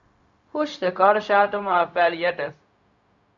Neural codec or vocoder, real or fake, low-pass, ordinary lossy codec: codec, 16 kHz, 0.4 kbps, LongCat-Audio-Codec; fake; 7.2 kHz; MP3, 48 kbps